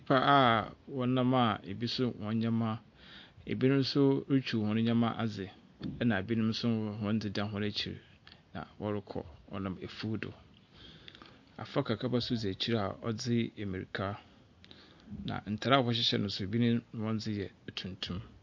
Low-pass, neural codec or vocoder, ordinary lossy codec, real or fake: 7.2 kHz; none; MP3, 48 kbps; real